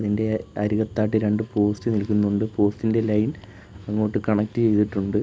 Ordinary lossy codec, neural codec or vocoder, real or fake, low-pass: none; none; real; none